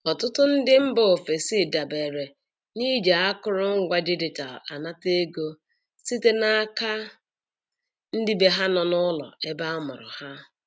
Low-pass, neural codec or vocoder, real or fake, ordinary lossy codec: none; none; real; none